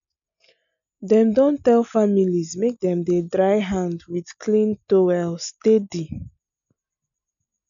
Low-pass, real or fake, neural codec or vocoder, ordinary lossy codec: 7.2 kHz; real; none; none